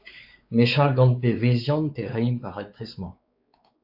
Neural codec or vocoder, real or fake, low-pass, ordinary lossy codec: codec, 16 kHz, 4 kbps, X-Codec, WavLM features, trained on Multilingual LibriSpeech; fake; 5.4 kHz; AAC, 48 kbps